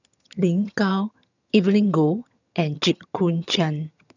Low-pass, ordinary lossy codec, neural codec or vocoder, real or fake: 7.2 kHz; none; vocoder, 22.05 kHz, 80 mel bands, HiFi-GAN; fake